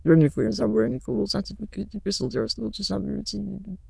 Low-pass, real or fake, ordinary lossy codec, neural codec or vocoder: none; fake; none; autoencoder, 22.05 kHz, a latent of 192 numbers a frame, VITS, trained on many speakers